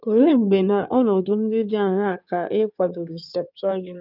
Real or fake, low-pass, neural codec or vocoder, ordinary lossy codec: fake; 5.4 kHz; codec, 16 kHz in and 24 kHz out, 2.2 kbps, FireRedTTS-2 codec; none